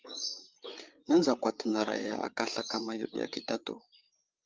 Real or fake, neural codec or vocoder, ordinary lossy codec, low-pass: fake; codec, 16 kHz, 8 kbps, FreqCodec, larger model; Opus, 32 kbps; 7.2 kHz